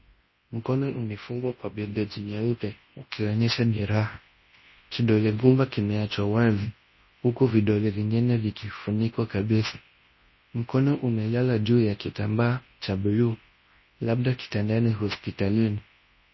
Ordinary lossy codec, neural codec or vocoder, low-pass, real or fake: MP3, 24 kbps; codec, 24 kHz, 0.9 kbps, WavTokenizer, large speech release; 7.2 kHz; fake